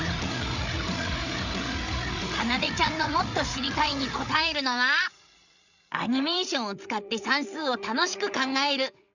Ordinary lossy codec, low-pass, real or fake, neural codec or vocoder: none; 7.2 kHz; fake; codec, 16 kHz, 8 kbps, FreqCodec, larger model